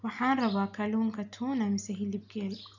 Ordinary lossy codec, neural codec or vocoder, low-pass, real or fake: none; none; 7.2 kHz; real